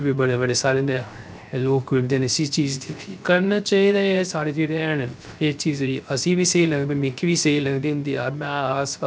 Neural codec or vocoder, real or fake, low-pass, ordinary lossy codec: codec, 16 kHz, 0.3 kbps, FocalCodec; fake; none; none